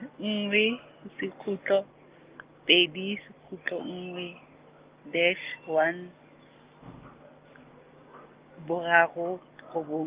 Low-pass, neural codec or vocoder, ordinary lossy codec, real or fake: 3.6 kHz; none; Opus, 32 kbps; real